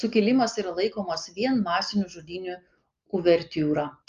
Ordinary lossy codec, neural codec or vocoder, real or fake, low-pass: Opus, 32 kbps; none; real; 7.2 kHz